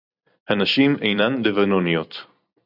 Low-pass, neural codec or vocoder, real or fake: 5.4 kHz; vocoder, 44.1 kHz, 128 mel bands every 256 samples, BigVGAN v2; fake